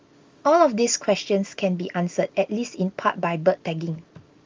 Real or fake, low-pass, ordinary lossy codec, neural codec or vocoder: real; 7.2 kHz; Opus, 32 kbps; none